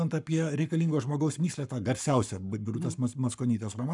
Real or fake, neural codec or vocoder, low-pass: fake; codec, 44.1 kHz, 7.8 kbps, Pupu-Codec; 10.8 kHz